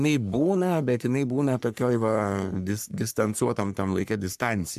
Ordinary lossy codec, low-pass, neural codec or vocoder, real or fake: AAC, 96 kbps; 14.4 kHz; codec, 44.1 kHz, 3.4 kbps, Pupu-Codec; fake